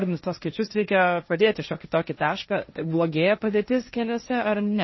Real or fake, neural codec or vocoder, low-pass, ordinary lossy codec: fake; codec, 16 kHz, 1.1 kbps, Voila-Tokenizer; 7.2 kHz; MP3, 24 kbps